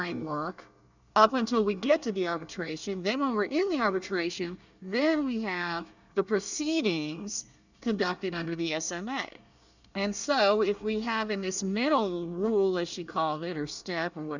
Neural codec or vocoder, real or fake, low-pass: codec, 24 kHz, 1 kbps, SNAC; fake; 7.2 kHz